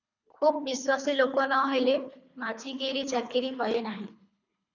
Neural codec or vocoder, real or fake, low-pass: codec, 24 kHz, 3 kbps, HILCodec; fake; 7.2 kHz